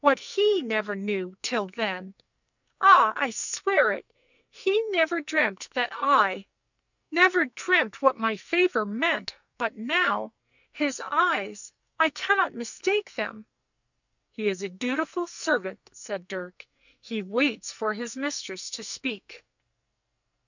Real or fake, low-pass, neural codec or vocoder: fake; 7.2 kHz; codec, 44.1 kHz, 2.6 kbps, SNAC